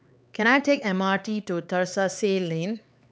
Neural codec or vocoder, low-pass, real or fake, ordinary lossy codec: codec, 16 kHz, 4 kbps, X-Codec, HuBERT features, trained on LibriSpeech; none; fake; none